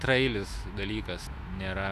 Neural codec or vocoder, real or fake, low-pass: autoencoder, 48 kHz, 128 numbers a frame, DAC-VAE, trained on Japanese speech; fake; 14.4 kHz